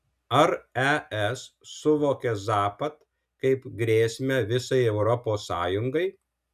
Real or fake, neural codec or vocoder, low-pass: real; none; 14.4 kHz